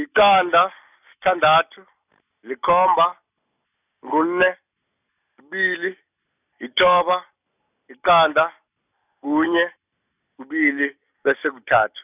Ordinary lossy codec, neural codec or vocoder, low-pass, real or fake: none; none; 3.6 kHz; real